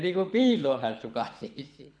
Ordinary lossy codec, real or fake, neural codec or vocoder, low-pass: none; fake; codec, 24 kHz, 6 kbps, HILCodec; 9.9 kHz